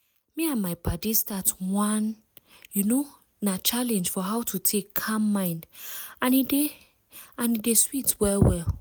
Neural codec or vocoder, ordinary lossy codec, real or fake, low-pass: none; none; real; none